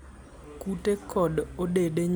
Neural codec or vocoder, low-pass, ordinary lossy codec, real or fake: none; none; none; real